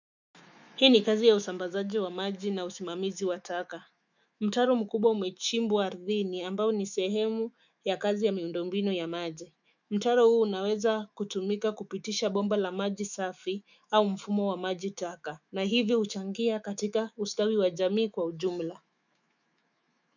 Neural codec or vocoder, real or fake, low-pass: autoencoder, 48 kHz, 128 numbers a frame, DAC-VAE, trained on Japanese speech; fake; 7.2 kHz